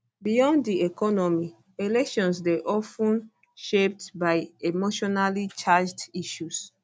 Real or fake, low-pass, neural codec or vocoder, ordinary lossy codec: real; none; none; none